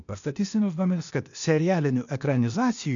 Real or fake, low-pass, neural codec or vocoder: fake; 7.2 kHz; codec, 16 kHz, 0.8 kbps, ZipCodec